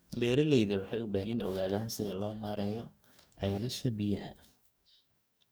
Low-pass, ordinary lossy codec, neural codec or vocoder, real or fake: none; none; codec, 44.1 kHz, 2.6 kbps, DAC; fake